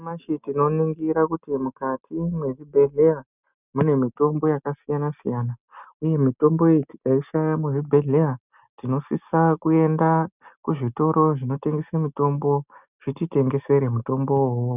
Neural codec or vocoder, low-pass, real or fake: none; 3.6 kHz; real